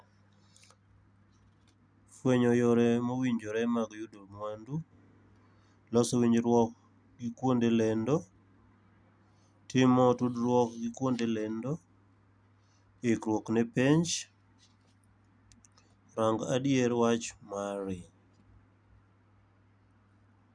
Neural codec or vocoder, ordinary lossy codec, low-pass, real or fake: none; none; 9.9 kHz; real